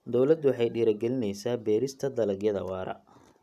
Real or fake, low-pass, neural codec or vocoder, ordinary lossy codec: real; 14.4 kHz; none; none